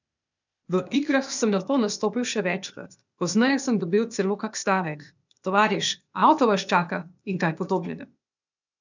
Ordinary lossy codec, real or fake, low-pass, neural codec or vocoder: none; fake; 7.2 kHz; codec, 16 kHz, 0.8 kbps, ZipCodec